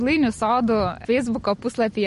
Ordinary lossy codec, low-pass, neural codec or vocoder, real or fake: MP3, 48 kbps; 10.8 kHz; none; real